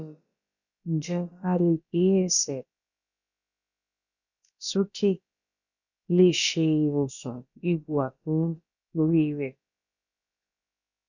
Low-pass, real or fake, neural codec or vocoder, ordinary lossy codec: 7.2 kHz; fake; codec, 16 kHz, about 1 kbps, DyCAST, with the encoder's durations; none